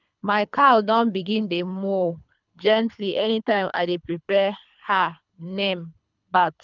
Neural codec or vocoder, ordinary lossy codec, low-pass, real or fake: codec, 24 kHz, 3 kbps, HILCodec; none; 7.2 kHz; fake